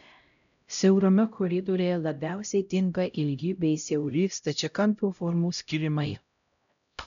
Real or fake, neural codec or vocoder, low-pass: fake; codec, 16 kHz, 0.5 kbps, X-Codec, HuBERT features, trained on LibriSpeech; 7.2 kHz